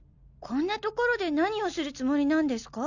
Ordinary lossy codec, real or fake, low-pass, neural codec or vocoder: none; real; 7.2 kHz; none